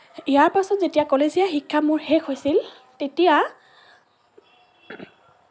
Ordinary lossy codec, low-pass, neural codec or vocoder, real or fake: none; none; none; real